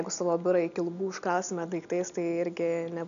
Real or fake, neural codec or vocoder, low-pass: real; none; 7.2 kHz